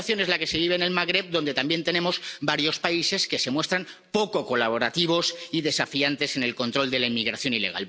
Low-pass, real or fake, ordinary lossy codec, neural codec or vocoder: none; real; none; none